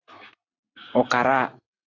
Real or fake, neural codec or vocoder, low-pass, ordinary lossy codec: real; none; 7.2 kHz; AAC, 32 kbps